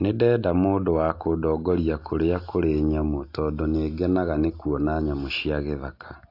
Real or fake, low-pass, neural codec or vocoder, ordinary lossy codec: real; 5.4 kHz; none; AAC, 32 kbps